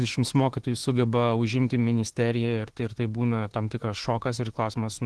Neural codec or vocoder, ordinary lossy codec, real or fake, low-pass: autoencoder, 48 kHz, 32 numbers a frame, DAC-VAE, trained on Japanese speech; Opus, 16 kbps; fake; 10.8 kHz